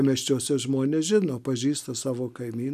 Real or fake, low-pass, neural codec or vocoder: real; 14.4 kHz; none